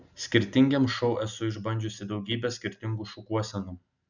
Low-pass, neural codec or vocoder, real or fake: 7.2 kHz; none; real